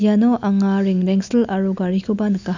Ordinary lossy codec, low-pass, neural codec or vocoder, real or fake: none; 7.2 kHz; none; real